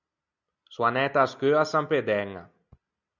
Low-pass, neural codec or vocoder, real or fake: 7.2 kHz; none; real